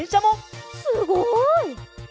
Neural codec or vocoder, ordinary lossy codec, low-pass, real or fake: none; none; none; real